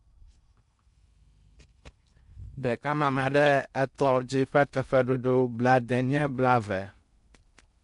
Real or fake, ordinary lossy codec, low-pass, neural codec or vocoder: fake; MP3, 64 kbps; 10.8 kHz; codec, 16 kHz in and 24 kHz out, 0.6 kbps, FocalCodec, streaming, 4096 codes